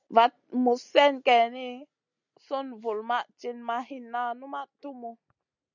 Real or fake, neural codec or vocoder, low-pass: real; none; 7.2 kHz